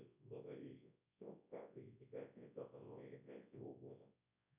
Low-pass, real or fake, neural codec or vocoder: 3.6 kHz; fake; codec, 24 kHz, 0.9 kbps, WavTokenizer, large speech release